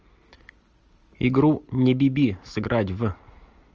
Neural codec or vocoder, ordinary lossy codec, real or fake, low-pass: none; Opus, 24 kbps; real; 7.2 kHz